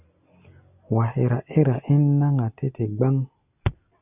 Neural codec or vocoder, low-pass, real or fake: none; 3.6 kHz; real